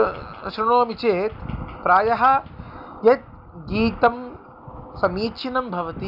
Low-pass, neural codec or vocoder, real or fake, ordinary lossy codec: 5.4 kHz; none; real; none